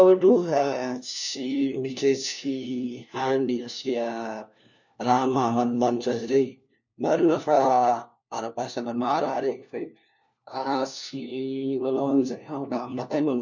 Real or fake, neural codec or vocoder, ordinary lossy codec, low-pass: fake; codec, 16 kHz, 1 kbps, FunCodec, trained on LibriTTS, 50 frames a second; none; 7.2 kHz